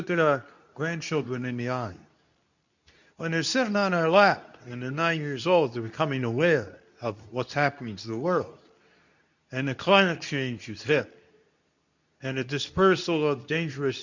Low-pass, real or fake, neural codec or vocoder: 7.2 kHz; fake; codec, 24 kHz, 0.9 kbps, WavTokenizer, medium speech release version 2